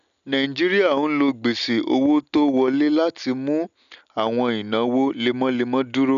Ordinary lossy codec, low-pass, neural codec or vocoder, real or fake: none; 7.2 kHz; none; real